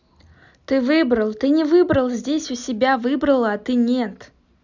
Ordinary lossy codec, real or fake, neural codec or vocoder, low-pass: none; real; none; 7.2 kHz